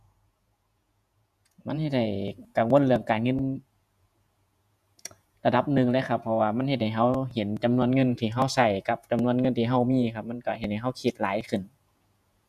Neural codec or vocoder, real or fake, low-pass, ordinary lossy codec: vocoder, 48 kHz, 128 mel bands, Vocos; fake; 14.4 kHz; none